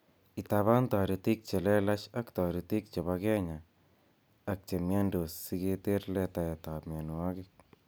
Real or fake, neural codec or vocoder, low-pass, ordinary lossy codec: real; none; none; none